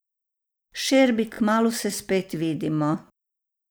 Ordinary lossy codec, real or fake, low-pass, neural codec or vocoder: none; real; none; none